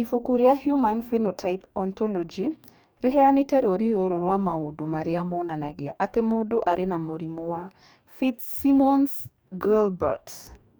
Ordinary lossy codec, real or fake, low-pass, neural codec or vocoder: none; fake; none; codec, 44.1 kHz, 2.6 kbps, DAC